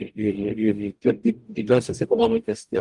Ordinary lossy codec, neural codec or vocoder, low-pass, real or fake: Opus, 32 kbps; codec, 44.1 kHz, 0.9 kbps, DAC; 10.8 kHz; fake